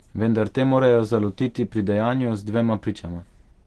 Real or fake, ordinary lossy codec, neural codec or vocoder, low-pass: real; Opus, 16 kbps; none; 10.8 kHz